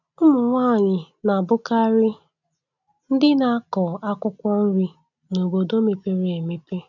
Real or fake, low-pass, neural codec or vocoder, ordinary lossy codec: real; 7.2 kHz; none; none